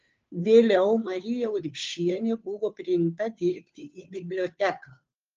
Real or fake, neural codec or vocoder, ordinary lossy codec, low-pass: fake; codec, 16 kHz, 2 kbps, FunCodec, trained on Chinese and English, 25 frames a second; Opus, 24 kbps; 7.2 kHz